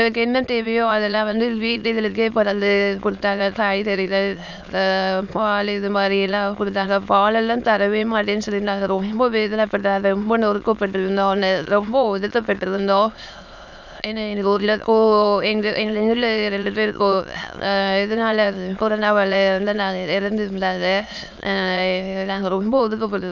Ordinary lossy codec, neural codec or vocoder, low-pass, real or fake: none; autoencoder, 22.05 kHz, a latent of 192 numbers a frame, VITS, trained on many speakers; 7.2 kHz; fake